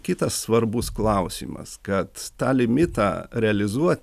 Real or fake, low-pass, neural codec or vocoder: real; 14.4 kHz; none